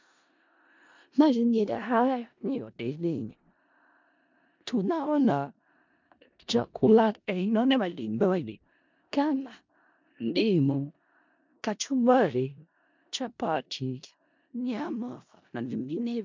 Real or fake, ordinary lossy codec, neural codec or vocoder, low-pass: fake; MP3, 64 kbps; codec, 16 kHz in and 24 kHz out, 0.4 kbps, LongCat-Audio-Codec, four codebook decoder; 7.2 kHz